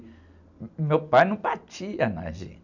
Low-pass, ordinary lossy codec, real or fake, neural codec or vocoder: 7.2 kHz; none; real; none